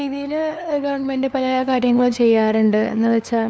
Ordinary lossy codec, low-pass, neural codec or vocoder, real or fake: none; none; codec, 16 kHz, 2 kbps, FunCodec, trained on LibriTTS, 25 frames a second; fake